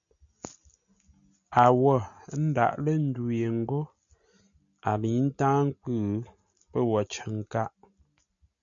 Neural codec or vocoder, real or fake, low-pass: none; real; 7.2 kHz